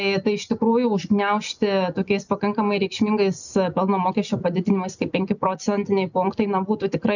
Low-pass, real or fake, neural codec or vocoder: 7.2 kHz; real; none